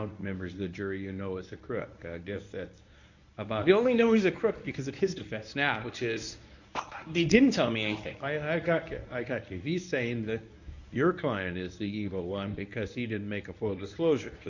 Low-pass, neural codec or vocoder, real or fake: 7.2 kHz; codec, 24 kHz, 0.9 kbps, WavTokenizer, medium speech release version 1; fake